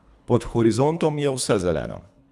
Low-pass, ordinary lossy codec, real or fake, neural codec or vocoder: none; none; fake; codec, 24 kHz, 3 kbps, HILCodec